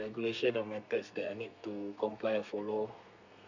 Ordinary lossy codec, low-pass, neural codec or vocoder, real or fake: none; 7.2 kHz; codec, 32 kHz, 1.9 kbps, SNAC; fake